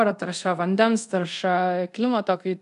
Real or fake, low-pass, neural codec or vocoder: fake; 10.8 kHz; codec, 24 kHz, 0.5 kbps, DualCodec